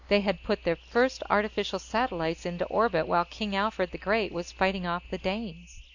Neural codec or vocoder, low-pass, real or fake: none; 7.2 kHz; real